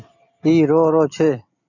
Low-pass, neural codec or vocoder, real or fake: 7.2 kHz; none; real